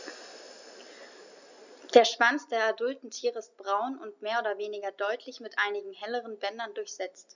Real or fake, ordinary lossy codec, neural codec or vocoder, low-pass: real; none; none; 7.2 kHz